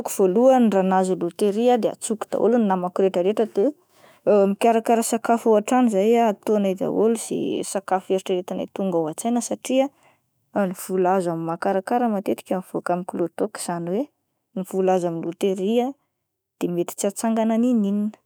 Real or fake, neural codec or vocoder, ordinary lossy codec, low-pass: fake; autoencoder, 48 kHz, 128 numbers a frame, DAC-VAE, trained on Japanese speech; none; none